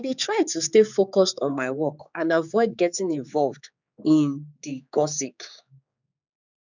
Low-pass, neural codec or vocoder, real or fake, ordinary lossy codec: 7.2 kHz; codec, 16 kHz, 4 kbps, X-Codec, HuBERT features, trained on general audio; fake; none